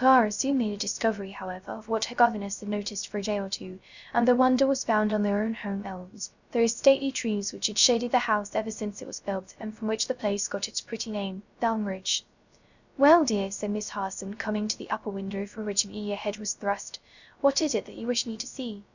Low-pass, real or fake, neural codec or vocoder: 7.2 kHz; fake; codec, 16 kHz, 0.3 kbps, FocalCodec